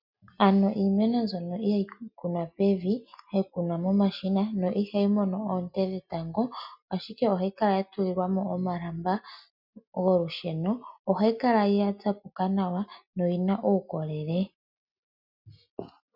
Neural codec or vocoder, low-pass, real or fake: none; 5.4 kHz; real